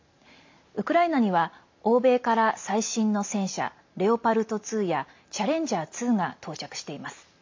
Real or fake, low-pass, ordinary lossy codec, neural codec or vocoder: real; 7.2 kHz; MP3, 48 kbps; none